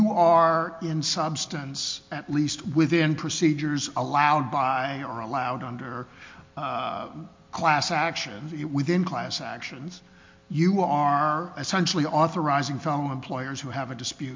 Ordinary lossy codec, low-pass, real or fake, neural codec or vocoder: MP3, 48 kbps; 7.2 kHz; real; none